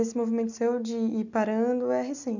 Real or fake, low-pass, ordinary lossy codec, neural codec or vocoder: real; 7.2 kHz; none; none